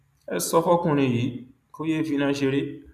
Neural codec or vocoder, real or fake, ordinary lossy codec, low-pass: none; real; none; 14.4 kHz